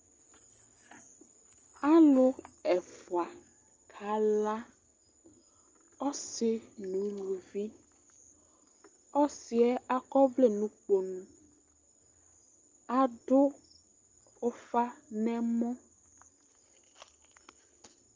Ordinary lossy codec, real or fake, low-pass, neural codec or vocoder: Opus, 24 kbps; real; 7.2 kHz; none